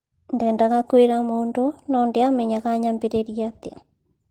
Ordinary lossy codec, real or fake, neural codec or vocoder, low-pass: Opus, 16 kbps; real; none; 19.8 kHz